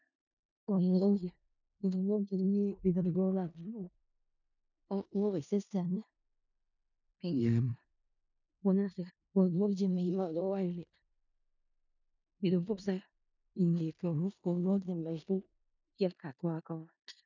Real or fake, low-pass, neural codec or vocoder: fake; 7.2 kHz; codec, 16 kHz in and 24 kHz out, 0.4 kbps, LongCat-Audio-Codec, four codebook decoder